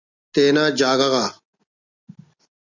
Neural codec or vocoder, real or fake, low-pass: none; real; 7.2 kHz